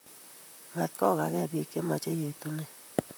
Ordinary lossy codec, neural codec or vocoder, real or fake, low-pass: none; vocoder, 44.1 kHz, 128 mel bands, Pupu-Vocoder; fake; none